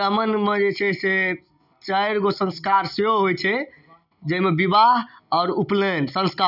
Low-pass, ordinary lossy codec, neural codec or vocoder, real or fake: 5.4 kHz; none; none; real